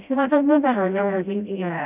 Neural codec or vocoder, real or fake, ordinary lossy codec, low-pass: codec, 16 kHz, 0.5 kbps, FreqCodec, smaller model; fake; none; 3.6 kHz